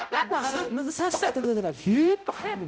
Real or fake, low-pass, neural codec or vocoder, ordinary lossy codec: fake; none; codec, 16 kHz, 0.5 kbps, X-Codec, HuBERT features, trained on balanced general audio; none